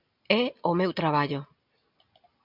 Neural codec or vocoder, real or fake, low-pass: none; real; 5.4 kHz